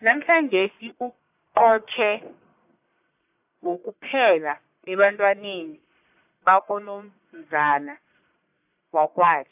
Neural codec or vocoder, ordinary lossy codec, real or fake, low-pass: codec, 44.1 kHz, 1.7 kbps, Pupu-Codec; AAC, 32 kbps; fake; 3.6 kHz